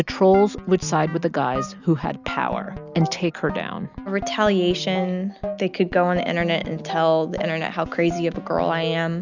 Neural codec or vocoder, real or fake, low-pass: none; real; 7.2 kHz